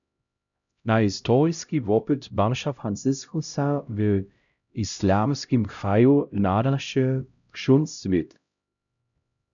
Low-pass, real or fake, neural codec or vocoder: 7.2 kHz; fake; codec, 16 kHz, 0.5 kbps, X-Codec, HuBERT features, trained on LibriSpeech